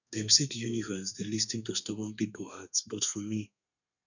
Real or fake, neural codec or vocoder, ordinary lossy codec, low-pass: fake; codec, 32 kHz, 1.9 kbps, SNAC; none; 7.2 kHz